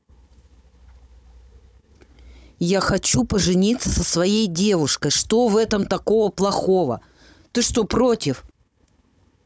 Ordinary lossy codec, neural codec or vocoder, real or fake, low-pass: none; codec, 16 kHz, 16 kbps, FunCodec, trained on Chinese and English, 50 frames a second; fake; none